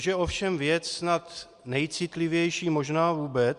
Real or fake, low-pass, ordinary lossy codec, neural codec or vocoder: real; 10.8 kHz; Opus, 64 kbps; none